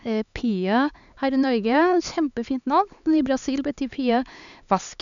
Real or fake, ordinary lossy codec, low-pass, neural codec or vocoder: fake; none; 7.2 kHz; codec, 16 kHz, 4 kbps, X-Codec, HuBERT features, trained on LibriSpeech